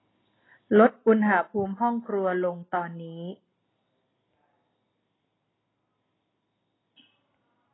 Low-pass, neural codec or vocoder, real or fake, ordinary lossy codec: 7.2 kHz; none; real; AAC, 16 kbps